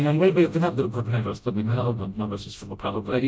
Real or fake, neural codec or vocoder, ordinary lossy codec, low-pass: fake; codec, 16 kHz, 0.5 kbps, FreqCodec, smaller model; none; none